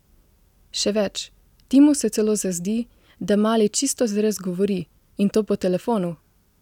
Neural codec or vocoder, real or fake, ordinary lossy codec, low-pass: none; real; none; 19.8 kHz